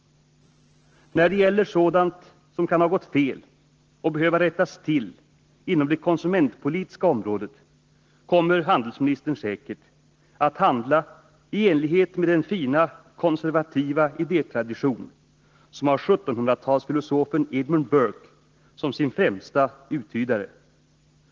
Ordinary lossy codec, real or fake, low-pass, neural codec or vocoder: Opus, 16 kbps; real; 7.2 kHz; none